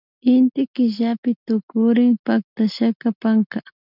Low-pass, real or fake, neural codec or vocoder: 5.4 kHz; real; none